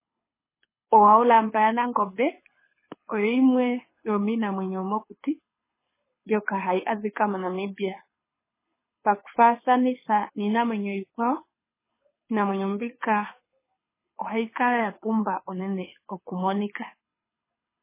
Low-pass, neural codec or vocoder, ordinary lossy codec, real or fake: 3.6 kHz; codec, 24 kHz, 6 kbps, HILCodec; MP3, 16 kbps; fake